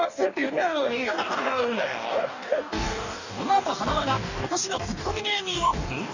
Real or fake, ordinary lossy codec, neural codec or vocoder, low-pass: fake; none; codec, 44.1 kHz, 2.6 kbps, DAC; 7.2 kHz